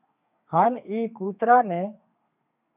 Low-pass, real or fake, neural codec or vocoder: 3.6 kHz; fake; codec, 32 kHz, 1.9 kbps, SNAC